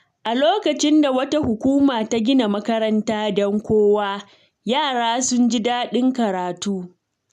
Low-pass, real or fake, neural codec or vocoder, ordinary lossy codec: 14.4 kHz; real; none; none